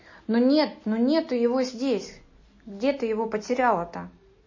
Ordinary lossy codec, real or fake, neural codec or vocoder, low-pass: MP3, 32 kbps; real; none; 7.2 kHz